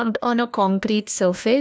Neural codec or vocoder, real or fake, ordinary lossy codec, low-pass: codec, 16 kHz, 1 kbps, FunCodec, trained on LibriTTS, 50 frames a second; fake; none; none